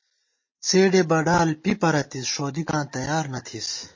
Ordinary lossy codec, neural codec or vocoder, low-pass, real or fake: MP3, 32 kbps; none; 7.2 kHz; real